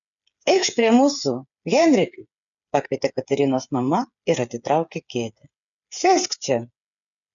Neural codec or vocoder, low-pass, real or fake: codec, 16 kHz, 8 kbps, FreqCodec, smaller model; 7.2 kHz; fake